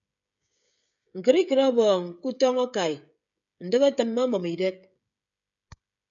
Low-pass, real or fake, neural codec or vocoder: 7.2 kHz; fake; codec, 16 kHz, 16 kbps, FreqCodec, smaller model